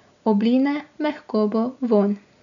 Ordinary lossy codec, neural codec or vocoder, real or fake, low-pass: none; none; real; 7.2 kHz